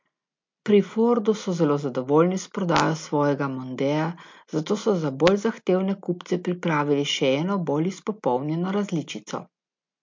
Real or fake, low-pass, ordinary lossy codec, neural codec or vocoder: real; 7.2 kHz; MP3, 48 kbps; none